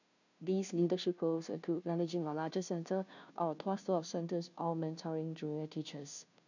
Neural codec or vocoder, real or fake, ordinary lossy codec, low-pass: codec, 16 kHz, 0.5 kbps, FunCodec, trained on Chinese and English, 25 frames a second; fake; none; 7.2 kHz